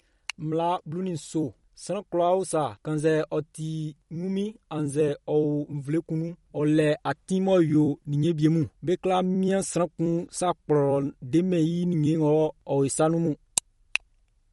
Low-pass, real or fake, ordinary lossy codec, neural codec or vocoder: 19.8 kHz; fake; MP3, 48 kbps; vocoder, 44.1 kHz, 128 mel bands every 256 samples, BigVGAN v2